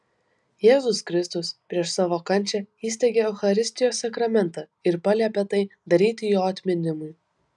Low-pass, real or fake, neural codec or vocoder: 10.8 kHz; real; none